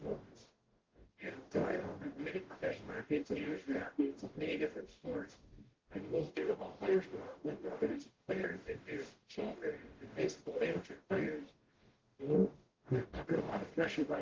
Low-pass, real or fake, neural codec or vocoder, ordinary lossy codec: 7.2 kHz; fake; codec, 44.1 kHz, 0.9 kbps, DAC; Opus, 16 kbps